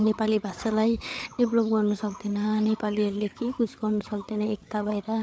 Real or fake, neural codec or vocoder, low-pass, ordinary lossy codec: fake; codec, 16 kHz, 8 kbps, FreqCodec, larger model; none; none